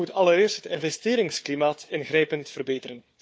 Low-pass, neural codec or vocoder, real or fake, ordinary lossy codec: none; codec, 16 kHz, 4 kbps, FunCodec, trained on Chinese and English, 50 frames a second; fake; none